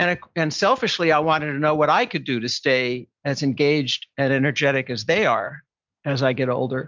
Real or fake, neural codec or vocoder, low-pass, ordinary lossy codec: real; none; 7.2 kHz; MP3, 64 kbps